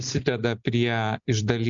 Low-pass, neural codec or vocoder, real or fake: 7.2 kHz; none; real